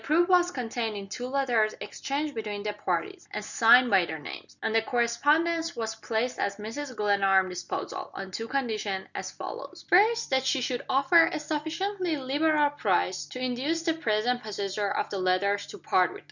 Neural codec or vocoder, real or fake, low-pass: none; real; 7.2 kHz